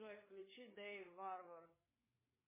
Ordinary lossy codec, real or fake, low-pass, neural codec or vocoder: MP3, 16 kbps; fake; 3.6 kHz; codec, 16 kHz, 4 kbps, FreqCodec, larger model